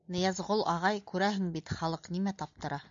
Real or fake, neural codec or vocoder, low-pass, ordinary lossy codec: real; none; 7.2 kHz; MP3, 64 kbps